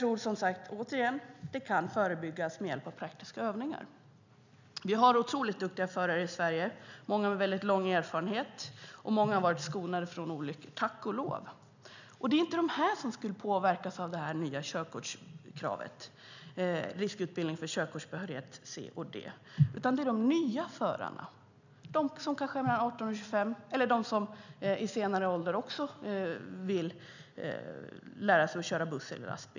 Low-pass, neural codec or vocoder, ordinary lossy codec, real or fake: 7.2 kHz; none; none; real